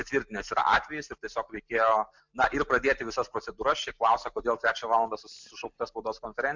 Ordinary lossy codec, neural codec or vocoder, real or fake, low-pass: MP3, 64 kbps; none; real; 7.2 kHz